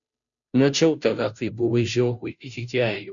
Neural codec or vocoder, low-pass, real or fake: codec, 16 kHz, 0.5 kbps, FunCodec, trained on Chinese and English, 25 frames a second; 7.2 kHz; fake